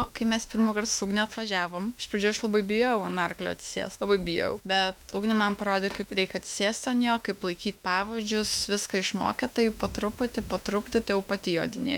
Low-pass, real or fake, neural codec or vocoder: 19.8 kHz; fake; autoencoder, 48 kHz, 32 numbers a frame, DAC-VAE, trained on Japanese speech